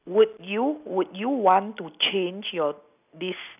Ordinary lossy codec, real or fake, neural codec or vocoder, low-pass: none; real; none; 3.6 kHz